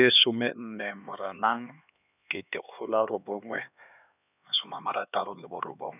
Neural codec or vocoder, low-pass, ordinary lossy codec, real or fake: codec, 16 kHz, 2 kbps, X-Codec, HuBERT features, trained on LibriSpeech; 3.6 kHz; none; fake